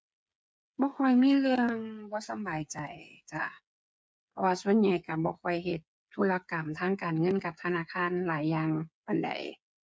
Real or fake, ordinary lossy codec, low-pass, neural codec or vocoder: fake; none; none; codec, 16 kHz, 8 kbps, FreqCodec, smaller model